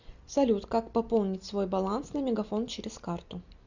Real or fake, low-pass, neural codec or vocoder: real; 7.2 kHz; none